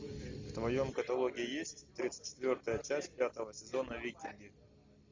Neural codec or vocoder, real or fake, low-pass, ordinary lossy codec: none; real; 7.2 kHz; MP3, 64 kbps